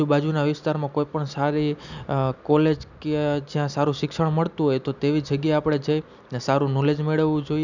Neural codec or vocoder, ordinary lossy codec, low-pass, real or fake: none; none; 7.2 kHz; real